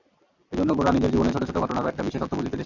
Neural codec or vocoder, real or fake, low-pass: none; real; 7.2 kHz